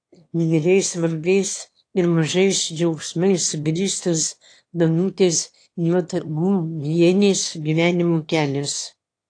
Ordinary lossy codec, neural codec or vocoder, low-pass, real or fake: AAC, 48 kbps; autoencoder, 22.05 kHz, a latent of 192 numbers a frame, VITS, trained on one speaker; 9.9 kHz; fake